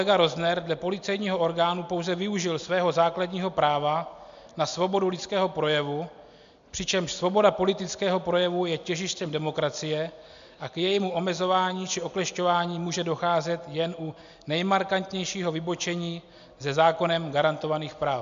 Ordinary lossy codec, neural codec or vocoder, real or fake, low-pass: MP3, 64 kbps; none; real; 7.2 kHz